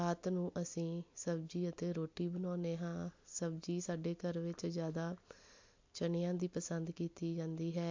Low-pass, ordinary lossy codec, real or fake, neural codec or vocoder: 7.2 kHz; MP3, 48 kbps; real; none